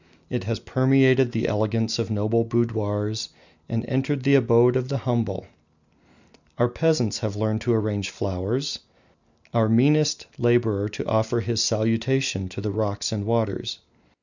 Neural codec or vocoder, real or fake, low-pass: none; real; 7.2 kHz